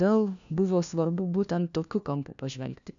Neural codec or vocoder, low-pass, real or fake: codec, 16 kHz, 1 kbps, FunCodec, trained on LibriTTS, 50 frames a second; 7.2 kHz; fake